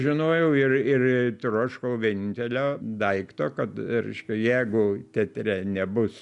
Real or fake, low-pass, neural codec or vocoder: real; 10.8 kHz; none